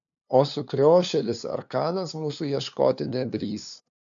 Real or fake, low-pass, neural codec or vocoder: fake; 7.2 kHz; codec, 16 kHz, 2 kbps, FunCodec, trained on LibriTTS, 25 frames a second